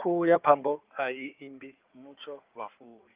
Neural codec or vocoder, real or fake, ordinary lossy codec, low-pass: codec, 16 kHz in and 24 kHz out, 2.2 kbps, FireRedTTS-2 codec; fake; Opus, 24 kbps; 3.6 kHz